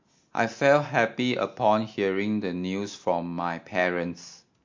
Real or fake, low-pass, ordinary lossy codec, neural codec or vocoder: real; 7.2 kHz; MP3, 48 kbps; none